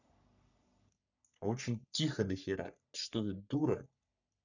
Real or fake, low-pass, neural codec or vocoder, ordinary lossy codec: fake; 7.2 kHz; codec, 44.1 kHz, 3.4 kbps, Pupu-Codec; none